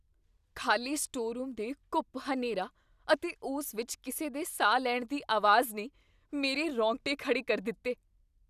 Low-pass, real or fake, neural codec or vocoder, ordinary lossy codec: 14.4 kHz; real; none; none